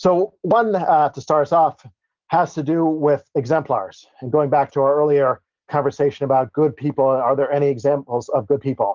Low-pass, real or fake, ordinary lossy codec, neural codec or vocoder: 7.2 kHz; real; Opus, 32 kbps; none